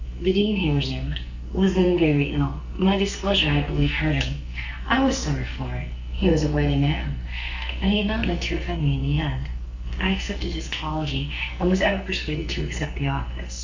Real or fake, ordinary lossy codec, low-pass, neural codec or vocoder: fake; Opus, 64 kbps; 7.2 kHz; codec, 44.1 kHz, 2.6 kbps, SNAC